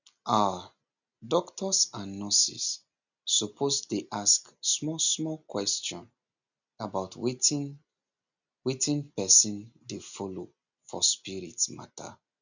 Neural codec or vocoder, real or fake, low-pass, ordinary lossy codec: none; real; 7.2 kHz; none